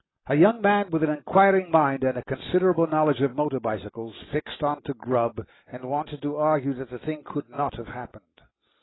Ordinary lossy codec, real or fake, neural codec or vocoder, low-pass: AAC, 16 kbps; real; none; 7.2 kHz